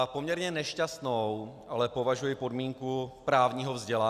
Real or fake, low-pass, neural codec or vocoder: real; 14.4 kHz; none